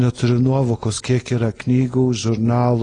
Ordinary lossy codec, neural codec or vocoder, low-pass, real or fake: AAC, 32 kbps; none; 9.9 kHz; real